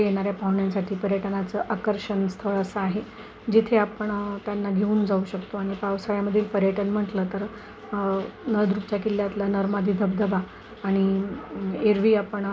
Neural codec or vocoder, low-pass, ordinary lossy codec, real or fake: none; none; none; real